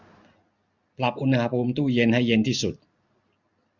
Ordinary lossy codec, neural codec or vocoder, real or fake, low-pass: none; none; real; 7.2 kHz